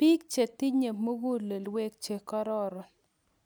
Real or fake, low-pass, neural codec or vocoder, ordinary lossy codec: real; none; none; none